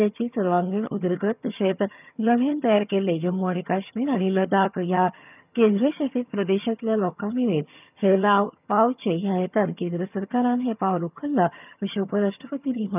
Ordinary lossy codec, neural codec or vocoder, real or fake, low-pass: none; vocoder, 22.05 kHz, 80 mel bands, HiFi-GAN; fake; 3.6 kHz